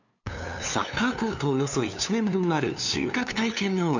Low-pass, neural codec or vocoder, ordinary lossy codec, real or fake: 7.2 kHz; codec, 16 kHz, 2 kbps, FunCodec, trained on LibriTTS, 25 frames a second; none; fake